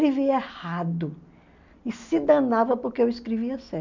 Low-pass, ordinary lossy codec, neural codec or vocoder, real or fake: 7.2 kHz; none; none; real